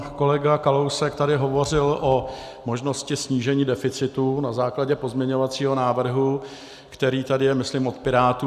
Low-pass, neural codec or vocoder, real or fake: 14.4 kHz; none; real